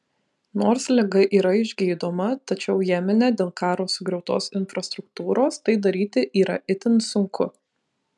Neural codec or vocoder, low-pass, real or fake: none; 10.8 kHz; real